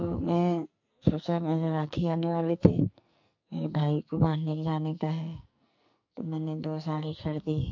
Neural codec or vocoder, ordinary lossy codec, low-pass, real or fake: codec, 44.1 kHz, 2.6 kbps, SNAC; MP3, 48 kbps; 7.2 kHz; fake